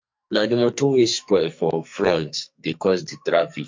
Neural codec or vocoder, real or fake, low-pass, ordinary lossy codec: codec, 44.1 kHz, 2.6 kbps, SNAC; fake; 7.2 kHz; MP3, 48 kbps